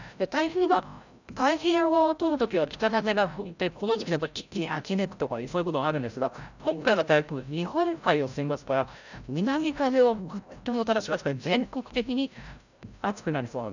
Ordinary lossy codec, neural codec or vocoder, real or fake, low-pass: none; codec, 16 kHz, 0.5 kbps, FreqCodec, larger model; fake; 7.2 kHz